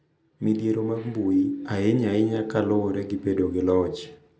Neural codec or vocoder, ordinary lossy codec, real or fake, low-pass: none; none; real; none